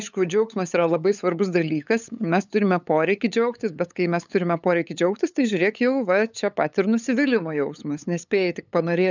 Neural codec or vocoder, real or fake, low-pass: codec, 16 kHz, 8 kbps, FunCodec, trained on LibriTTS, 25 frames a second; fake; 7.2 kHz